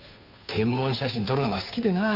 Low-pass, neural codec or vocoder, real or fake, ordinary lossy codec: 5.4 kHz; codec, 16 kHz, 2 kbps, FunCodec, trained on Chinese and English, 25 frames a second; fake; AAC, 32 kbps